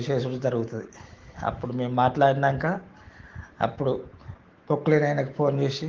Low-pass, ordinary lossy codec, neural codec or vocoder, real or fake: 7.2 kHz; Opus, 16 kbps; autoencoder, 48 kHz, 128 numbers a frame, DAC-VAE, trained on Japanese speech; fake